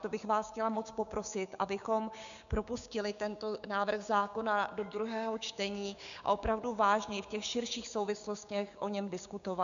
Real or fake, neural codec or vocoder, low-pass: fake; codec, 16 kHz, 6 kbps, DAC; 7.2 kHz